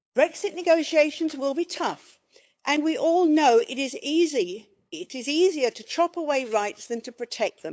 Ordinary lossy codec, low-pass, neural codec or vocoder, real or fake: none; none; codec, 16 kHz, 8 kbps, FunCodec, trained on LibriTTS, 25 frames a second; fake